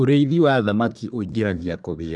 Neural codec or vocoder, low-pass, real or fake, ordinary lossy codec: codec, 24 kHz, 1 kbps, SNAC; 10.8 kHz; fake; none